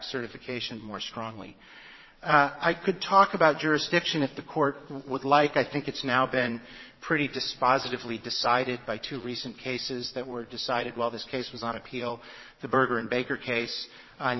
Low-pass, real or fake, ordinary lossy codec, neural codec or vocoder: 7.2 kHz; fake; MP3, 24 kbps; vocoder, 22.05 kHz, 80 mel bands, Vocos